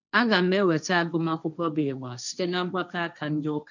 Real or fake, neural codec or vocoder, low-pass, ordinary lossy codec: fake; codec, 16 kHz, 1.1 kbps, Voila-Tokenizer; 7.2 kHz; none